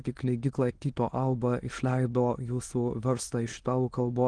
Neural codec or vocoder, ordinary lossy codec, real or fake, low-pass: autoencoder, 22.05 kHz, a latent of 192 numbers a frame, VITS, trained on many speakers; Opus, 16 kbps; fake; 9.9 kHz